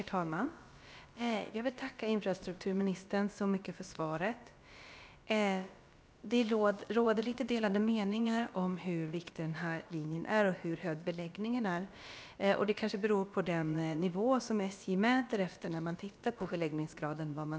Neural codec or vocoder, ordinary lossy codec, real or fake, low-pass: codec, 16 kHz, about 1 kbps, DyCAST, with the encoder's durations; none; fake; none